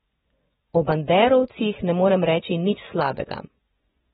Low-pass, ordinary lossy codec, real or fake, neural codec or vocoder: 10.8 kHz; AAC, 16 kbps; fake; vocoder, 24 kHz, 100 mel bands, Vocos